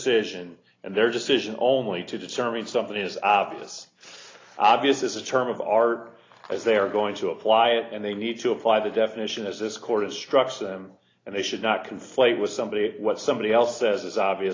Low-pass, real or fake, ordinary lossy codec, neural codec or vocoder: 7.2 kHz; real; AAC, 32 kbps; none